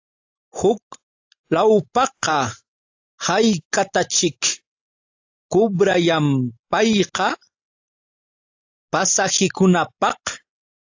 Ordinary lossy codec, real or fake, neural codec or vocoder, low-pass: AAC, 48 kbps; real; none; 7.2 kHz